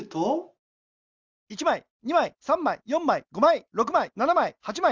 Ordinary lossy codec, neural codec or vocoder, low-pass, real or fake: Opus, 32 kbps; none; 7.2 kHz; real